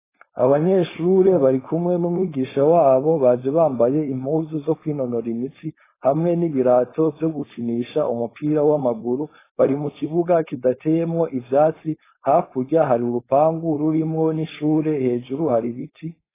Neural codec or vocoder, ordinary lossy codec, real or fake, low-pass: codec, 16 kHz, 4.8 kbps, FACodec; AAC, 16 kbps; fake; 3.6 kHz